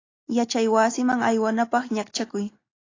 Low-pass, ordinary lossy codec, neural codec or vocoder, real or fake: 7.2 kHz; AAC, 32 kbps; none; real